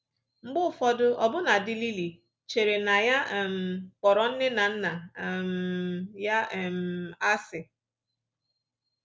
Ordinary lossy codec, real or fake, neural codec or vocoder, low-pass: none; real; none; none